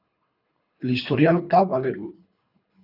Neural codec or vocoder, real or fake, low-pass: codec, 24 kHz, 3 kbps, HILCodec; fake; 5.4 kHz